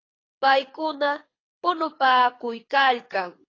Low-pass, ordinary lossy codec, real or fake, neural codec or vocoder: 7.2 kHz; AAC, 32 kbps; fake; codec, 24 kHz, 6 kbps, HILCodec